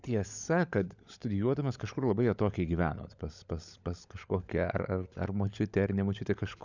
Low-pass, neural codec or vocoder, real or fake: 7.2 kHz; codec, 16 kHz, 16 kbps, FunCodec, trained on LibriTTS, 50 frames a second; fake